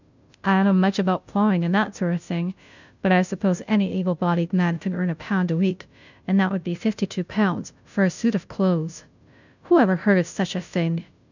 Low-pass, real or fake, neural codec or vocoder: 7.2 kHz; fake; codec, 16 kHz, 0.5 kbps, FunCodec, trained on Chinese and English, 25 frames a second